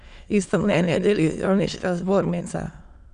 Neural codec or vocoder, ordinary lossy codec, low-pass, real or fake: autoencoder, 22.05 kHz, a latent of 192 numbers a frame, VITS, trained on many speakers; none; 9.9 kHz; fake